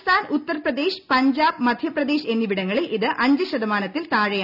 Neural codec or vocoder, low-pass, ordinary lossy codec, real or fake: none; 5.4 kHz; none; real